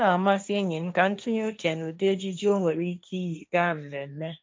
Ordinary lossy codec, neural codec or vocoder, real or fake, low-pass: none; codec, 16 kHz, 1.1 kbps, Voila-Tokenizer; fake; none